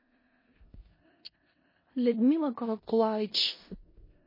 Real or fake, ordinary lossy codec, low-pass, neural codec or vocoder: fake; MP3, 24 kbps; 5.4 kHz; codec, 16 kHz in and 24 kHz out, 0.4 kbps, LongCat-Audio-Codec, four codebook decoder